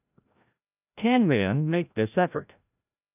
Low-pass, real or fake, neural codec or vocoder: 3.6 kHz; fake; codec, 16 kHz, 0.5 kbps, FreqCodec, larger model